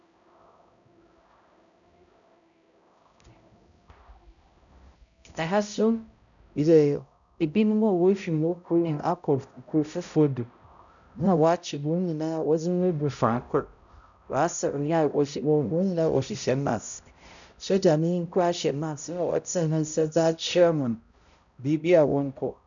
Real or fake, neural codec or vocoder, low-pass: fake; codec, 16 kHz, 0.5 kbps, X-Codec, HuBERT features, trained on balanced general audio; 7.2 kHz